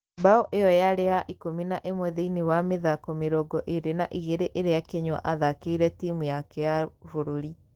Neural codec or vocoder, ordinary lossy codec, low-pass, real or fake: autoencoder, 48 kHz, 128 numbers a frame, DAC-VAE, trained on Japanese speech; Opus, 16 kbps; 19.8 kHz; fake